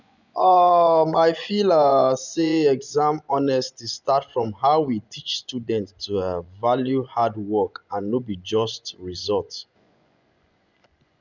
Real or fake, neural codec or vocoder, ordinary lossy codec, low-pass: fake; vocoder, 24 kHz, 100 mel bands, Vocos; none; 7.2 kHz